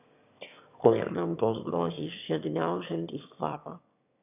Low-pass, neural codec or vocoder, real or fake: 3.6 kHz; autoencoder, 22.05 kHz, a latent of 192 numbers a frame, VITS, trained on one speaker; fake